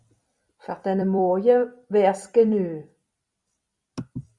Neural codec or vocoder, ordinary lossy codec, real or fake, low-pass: vocoder, 44.1 kHz, 128 mel bands every 256 samples, BigVGAN v2; Opus, 64 kbps; fake; 10.8 kHz